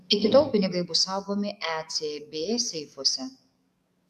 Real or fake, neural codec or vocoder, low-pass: fake; codec, 44.1 kHz, 7.8 kbps, DAC; 14.4 kHz